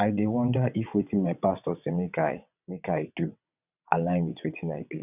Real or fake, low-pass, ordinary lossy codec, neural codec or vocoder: fake; 3.6 kHz; none; vocoder, 22.05 kHz, 80 mel bands, WaveNeXt